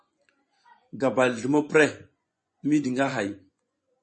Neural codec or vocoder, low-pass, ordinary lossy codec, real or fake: vocoder, 24 kHz, 100 mel bands, Vocos; 9.9 kHz; MP3, 32 kbps; fake